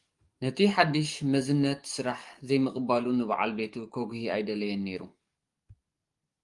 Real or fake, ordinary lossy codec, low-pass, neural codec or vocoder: fake; Opus, 32 kbps; 10.8 kHz; codec, 44.1 kHz, 7.8 kbps, DAC